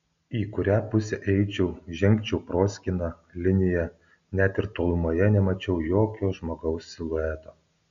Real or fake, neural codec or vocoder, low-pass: real; none; 7.2 kHz